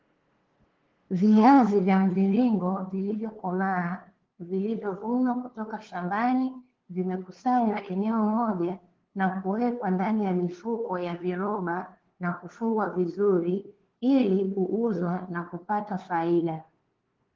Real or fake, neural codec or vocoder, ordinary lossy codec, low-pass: fake; codec, 16 kHz, 2 kbps, FunCodec, trained on LibriTTS, 25 frames a second; Opus, 16 kbps; 7.2 kHz